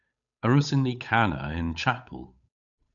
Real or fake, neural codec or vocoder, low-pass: fake; codec, 16 kHz, 8 kbps, FunCodec, trained on Chinese and English, 25 frames a second; 7.2 kHz